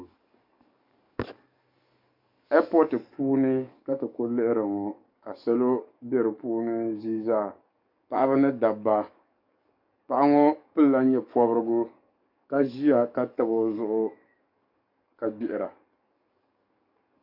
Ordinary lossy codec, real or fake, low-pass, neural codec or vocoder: MP3, 48 kbps; fake; 5.4 kHz; codec, 44.1 kHz, 7.8 kbps, DAC